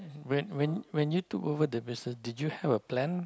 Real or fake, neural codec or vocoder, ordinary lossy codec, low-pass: real; none; none; none